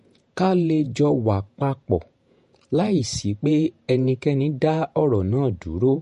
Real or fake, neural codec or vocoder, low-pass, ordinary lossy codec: fake; vocoder, 48 kHz, 128 mel bands, Vocos; 14.4 kHz; MP3, 48 kbps